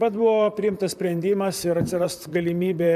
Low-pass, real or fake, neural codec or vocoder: 14.4 kHz; fake; vocoder, 44.1 kHz, 128 mel bands, Pupu-Vocoder